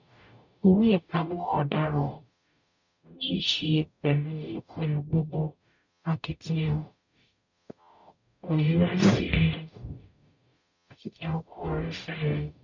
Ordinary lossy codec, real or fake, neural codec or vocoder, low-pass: none; fake; codec, 44.1 kHz, 0.9 kbps, DAC; 7.2 kHz